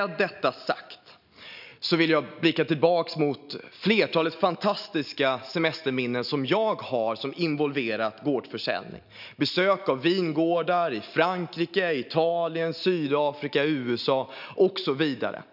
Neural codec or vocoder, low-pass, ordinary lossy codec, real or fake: none; 5.4 kHz; none; real